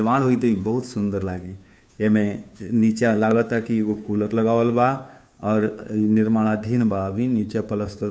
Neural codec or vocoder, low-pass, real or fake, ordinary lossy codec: codec, 16 kHz, 2 kbps, FunCodec, trained on Chinese and English, 25 frames a second; none; fake; none